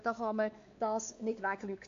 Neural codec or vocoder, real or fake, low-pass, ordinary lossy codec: codec, 16 kHz, 2 kbps, X-Codec, WavLM features, trained on Multilingual LibriSpeech; fake; 7.2 kHz; AAC, 48 kbps